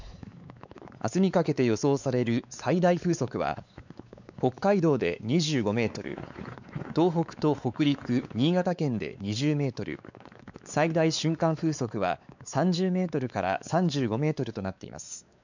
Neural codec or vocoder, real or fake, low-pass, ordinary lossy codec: codec, 16 kHz, 4 kbps, X-Codec, WavLM features, trained on Multilingual LibriSpeech; fake; 7.2 kHz; none